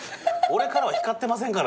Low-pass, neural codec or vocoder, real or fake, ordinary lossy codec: none; none; real; none